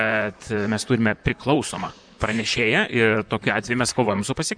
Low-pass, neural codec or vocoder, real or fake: 9.9 kHz; vocoder, 44.1 kHz, 128 mel bands, Pupu-Vocoder; fake